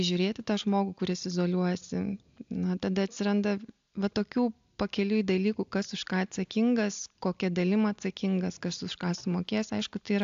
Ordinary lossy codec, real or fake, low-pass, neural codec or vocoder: AAC, 64 kbps; real; 7.2 kHz; none